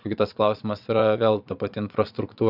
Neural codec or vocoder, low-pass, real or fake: vocoder, 22.05 kHz, 80 mel bands, Vocos; 5.4 kHz; fake